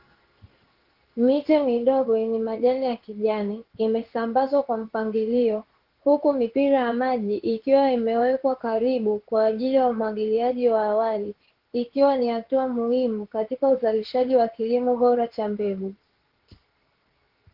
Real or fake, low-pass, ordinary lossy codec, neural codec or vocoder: fake; 5.4 kHz; Opus, 16 kbps; codec, 16 kHz in and 24 kHz out, 1 kbps, XY-Tokenizer